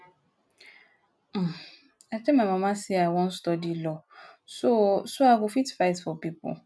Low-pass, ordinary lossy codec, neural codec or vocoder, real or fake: none; none; none; real